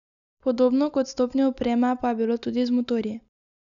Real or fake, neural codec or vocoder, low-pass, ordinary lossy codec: real; none; 7.2 kHz; none